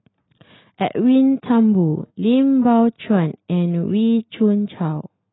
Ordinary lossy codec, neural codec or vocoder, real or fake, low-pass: AAC, 16 kbps; none; real; 7.2 kHz